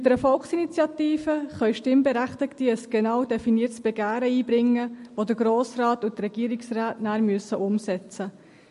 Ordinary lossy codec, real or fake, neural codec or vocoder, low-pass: MP3, 48 kbps; real; none; 14.4 kHz